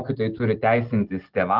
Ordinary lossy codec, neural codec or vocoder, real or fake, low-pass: Opus, 16 kbps; none; real; 5.4 kHz